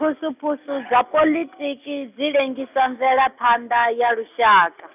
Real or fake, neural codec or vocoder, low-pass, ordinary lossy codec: real; none; 3.6 kHz; none